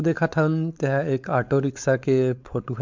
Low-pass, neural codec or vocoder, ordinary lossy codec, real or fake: 7.2 kHz; codec, 16 kHz, 4.8 kbps, FACodec; MP3, 64 kbps; fake